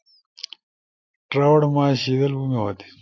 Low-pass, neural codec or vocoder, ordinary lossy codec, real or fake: 7.2 kHz; none; AAC, 48 kbps; real